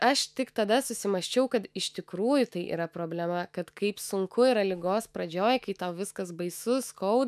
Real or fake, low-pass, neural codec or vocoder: fake; 14.4 kHz; autoencoder, 48 kHz, 128 numbers a frame, DAC-VAE, trained on Japanese speech